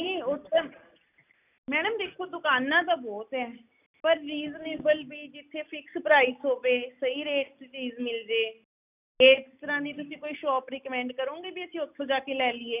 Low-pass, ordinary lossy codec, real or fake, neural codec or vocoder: 3.6 kHz; none; real; none